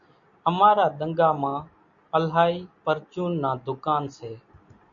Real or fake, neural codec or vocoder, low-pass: real; none; 7.2 kHz